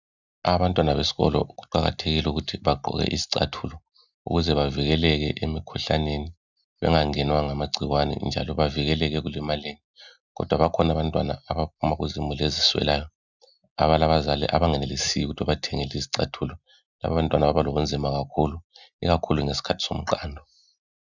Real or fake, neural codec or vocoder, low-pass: real; none; 7.2 kHz